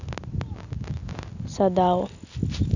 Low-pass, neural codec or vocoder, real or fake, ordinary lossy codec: 7.2 kHz; none; real; none